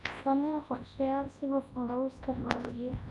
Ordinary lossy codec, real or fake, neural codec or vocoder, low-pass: none; fake; codec, 24 kHz, 0.9 kbps, WavTokenizer, large speech release; 10.8 kHz